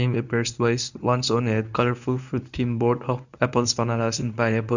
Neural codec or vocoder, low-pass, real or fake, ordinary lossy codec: codec, 24 kHz, 0.9 kbps, WavTokenizer, medium speech release version 1; 7.2 kHz; fake; none